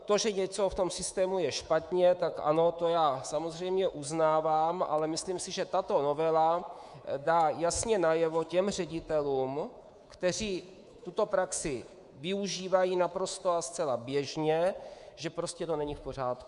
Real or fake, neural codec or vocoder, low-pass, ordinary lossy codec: fake; codec, 24 kHz, 3.1 kbps, DualCodec; 10.8 kHz; Opus, 64 kbps